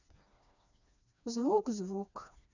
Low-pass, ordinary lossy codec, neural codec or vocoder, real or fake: 7.2 kHz; none; codec, 16 kHz, 2 kbps, FreqCodec, smaller model; fake